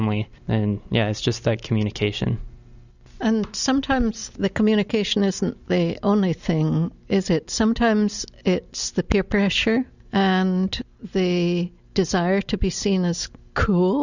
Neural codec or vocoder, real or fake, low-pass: none; real; 7.2 kHz